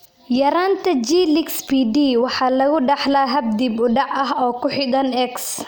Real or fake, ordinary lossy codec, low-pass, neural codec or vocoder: real; none; none; none